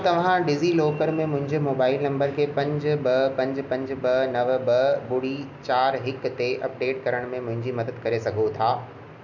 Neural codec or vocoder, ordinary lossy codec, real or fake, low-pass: none; none; real; 7.2 kHz